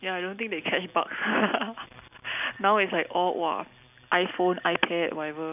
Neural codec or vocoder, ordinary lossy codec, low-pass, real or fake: none; none; 3.6 kHz; real